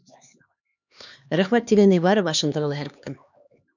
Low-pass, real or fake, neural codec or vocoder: 7.2 kHz; fake; codec, 16 kHz, 2 kbps, X-Codec, HuBERT features, trained on LibriSpeech